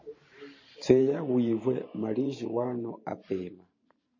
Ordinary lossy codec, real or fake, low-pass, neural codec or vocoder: MP3, 32 kbps; fake; 7.2 kHz; vocoder, 44.1 kHz, 128 mel bands every 512 samples, BigVGAN v2